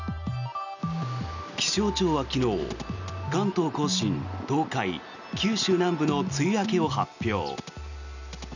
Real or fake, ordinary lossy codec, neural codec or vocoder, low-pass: real; none; none; 7.2 kHz